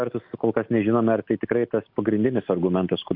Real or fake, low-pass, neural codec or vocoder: real; 5.4 kHz; none